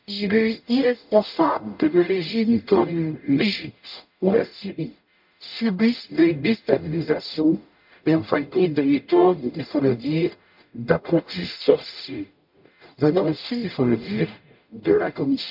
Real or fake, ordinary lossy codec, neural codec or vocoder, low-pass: fake; MP3, 48 kbps; codec, 44.1 kHz, 0.9 kbps, DAC; 5.4 kHz